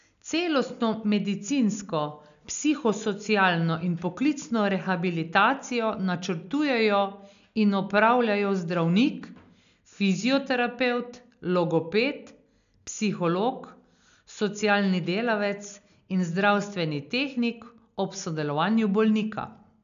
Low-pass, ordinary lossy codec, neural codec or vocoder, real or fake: 7.2 kHz; none; none; real